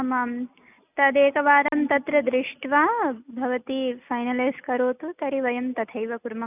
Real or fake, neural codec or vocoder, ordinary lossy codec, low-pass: real; none; none; 3.6 kHz